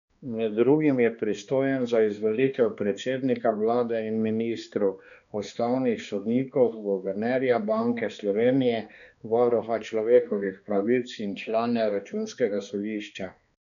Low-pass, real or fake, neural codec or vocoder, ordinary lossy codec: 7.2 kHz; fake; codec, 16 kHz, 2 kbps, X-Codec, HuBERT features, trained on balanced general audio; none